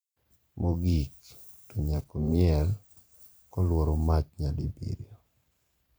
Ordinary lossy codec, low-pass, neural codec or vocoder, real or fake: none; none; vocoder, 44.1 kHz, 128 mel bands every 512 samples, BigVGAN v2; fake